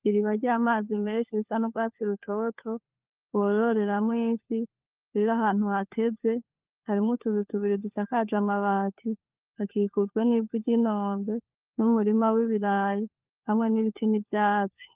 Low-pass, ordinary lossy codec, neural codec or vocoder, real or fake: 3.6 kHz; Opus, 32 kbps; codec, 16 kHz, 4 kbps, FunCodec, trained on LibriTTS, 50 frames a second; fake